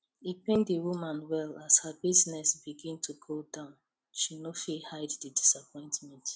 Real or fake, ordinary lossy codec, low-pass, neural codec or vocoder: real; none; none; none